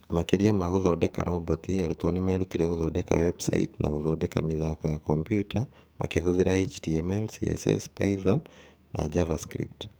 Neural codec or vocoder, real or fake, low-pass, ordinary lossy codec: codec, 44.1 kHz, 2.6 kbps, SNAC; fake; none; none